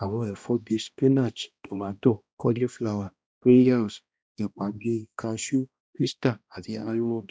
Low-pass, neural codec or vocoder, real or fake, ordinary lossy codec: none; codec, 16 kHz, 1 kbps, X-Codec, HuBERT features, trained on balanced general audio; fake; none